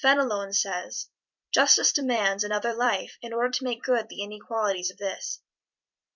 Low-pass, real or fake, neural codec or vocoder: 7.2 kHz; fake; vocoder, 44.1 kHz, 128 mel bands every 256 samples, BigVGAN v2